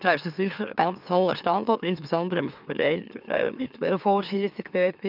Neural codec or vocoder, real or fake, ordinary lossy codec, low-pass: autoencoder, 44.1 kHz, a latent of 192 numbers a frame, MeloTTS; fake; none; 5.4 kHz